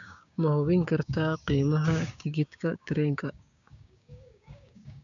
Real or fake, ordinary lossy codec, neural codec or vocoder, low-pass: fake; none; codec, 16 kHz, 6 kbps, DAC; 7.2 kHz